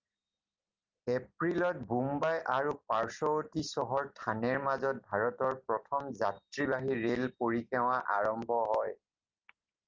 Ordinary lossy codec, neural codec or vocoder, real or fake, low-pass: Opus, 32 kbps; none; real; 7.2 kHz